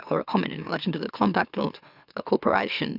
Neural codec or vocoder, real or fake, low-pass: autoencoder, 44.1 kHz, a latent of 192 numbers a frame, MeloTTS; fake; 5.4 kHz